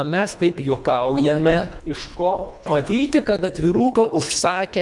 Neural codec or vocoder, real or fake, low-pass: codec, 24 kHz, 1.5 kbps, HILCodec; fake; 10.8 kHz